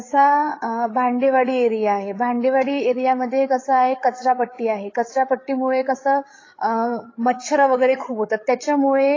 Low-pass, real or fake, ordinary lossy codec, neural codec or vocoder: 7.2 kHz; fake; AAC, 32 kbps; codec, 16 kHz, 8 kbps, FreqCodec, larger model